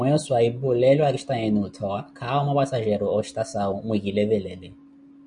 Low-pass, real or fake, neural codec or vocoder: 9.9 kHz; real; none